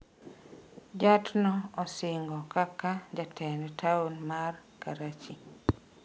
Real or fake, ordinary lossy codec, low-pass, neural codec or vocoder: real; none; none; none